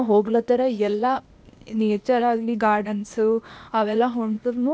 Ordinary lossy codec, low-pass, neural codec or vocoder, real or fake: none; none; codec, 16 kHz, 0.8 kbps, ZipCodec; fake